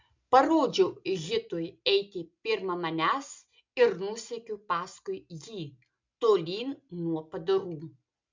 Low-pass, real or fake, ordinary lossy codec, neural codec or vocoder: 7.2 kHz; real; MP3, 64 kbps; none